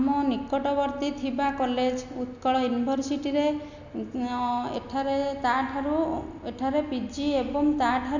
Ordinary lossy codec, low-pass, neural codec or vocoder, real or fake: none; 7.2 kHz; none; real